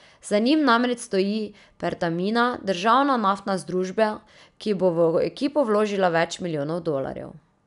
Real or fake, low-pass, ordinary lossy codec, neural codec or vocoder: real; 10.8 kHz; none; none